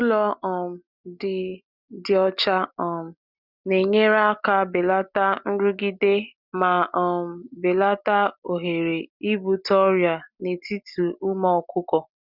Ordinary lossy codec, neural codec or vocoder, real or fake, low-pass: none; none; real; 5.4 kHz